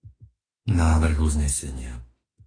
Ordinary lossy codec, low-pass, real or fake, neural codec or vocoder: AAC, 32 kbps; 9.9 kHz; fake; autoencoder, 48 kHz, 32 numbers a frame, DAC-VAE, trained on Japanese speech